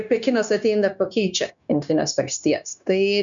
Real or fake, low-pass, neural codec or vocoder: fake; 7.2 kHz; codec, 16 kHz, 0.9 kbps, LongCat-Audio-Codec